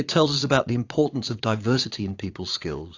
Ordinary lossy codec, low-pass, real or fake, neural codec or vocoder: AAC, 48 kbps; 7.2 kHz; fake; vocoder, 22.05 kHz, 80 mel bands, Vocos